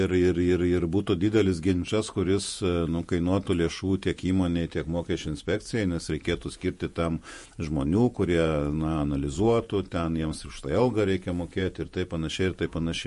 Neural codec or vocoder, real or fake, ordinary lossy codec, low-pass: vocoder, 48 kHz, 128 mel bands, Vocos; fake; MP3, 48 kbps; 14.4 kHz